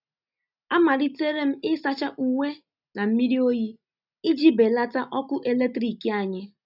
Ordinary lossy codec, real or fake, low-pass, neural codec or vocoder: none; real; 5.4 kHz; none